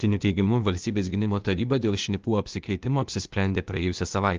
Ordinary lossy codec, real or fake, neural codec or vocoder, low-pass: Opus, 32 kbps; fake; codec, 16 kHz, 0.8 kbps, ZipCodec; 7.2 kHz